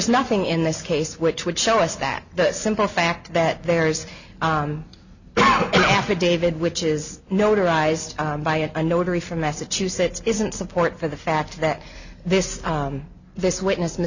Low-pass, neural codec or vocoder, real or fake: 7.2 kHz; none; real